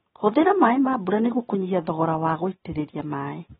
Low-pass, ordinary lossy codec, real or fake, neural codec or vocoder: 7.2 kHz; AAC, 16 kbps; real; none